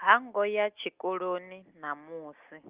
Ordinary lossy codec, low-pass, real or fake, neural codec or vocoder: Opus, 32 kbps; 3.6 kHz; real; none